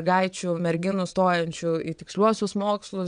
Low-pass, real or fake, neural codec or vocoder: 9.9 kHz; fake; vocoder, 22.05 kHz, 80 mel bands, WaveNeXt